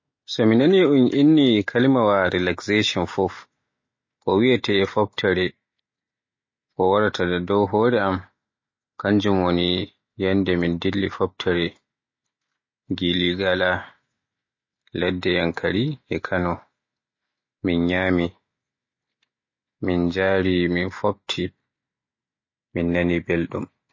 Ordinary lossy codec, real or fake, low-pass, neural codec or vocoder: MP3, 32 kbps; real; 7.2 kHz; none